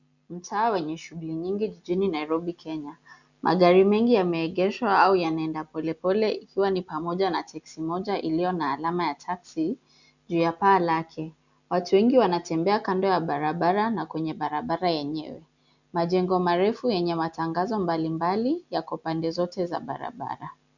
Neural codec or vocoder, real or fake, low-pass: none; real; 7.2 kHz